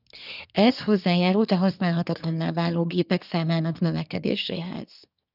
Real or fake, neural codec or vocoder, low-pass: fake; codec, 24 kHz, 1 kbps, SNAC; 5.4 kHz